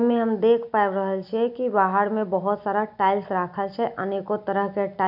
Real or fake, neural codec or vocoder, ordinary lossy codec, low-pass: real; none; none; 5.4 kHz